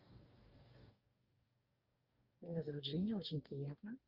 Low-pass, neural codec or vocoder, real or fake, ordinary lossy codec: 5.4 kHz; codec, 44.1 kHz, 2.6 kbps, DAC; fake; Opus, 32 kbps